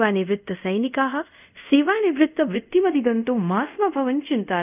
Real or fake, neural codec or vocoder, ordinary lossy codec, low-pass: fake; codec, 24 kHz, 0.5 kbps, DualCodec; none; 3.6 kHz